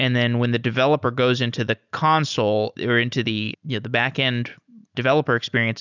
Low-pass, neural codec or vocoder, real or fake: 7.2 kHz; none; real